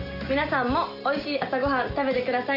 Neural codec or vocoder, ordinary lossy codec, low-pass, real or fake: none; none; 5.4 kHz; real